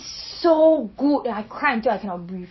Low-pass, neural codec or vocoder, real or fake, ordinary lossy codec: 7.2 kHz; vocoder, 24 kHz, 100 mel bands, Vocos; fake; MP3, 24 kbps